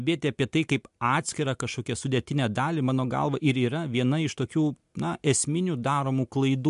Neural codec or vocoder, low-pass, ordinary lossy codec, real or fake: none; 10.8 kHz; MP3, 64 kbps; real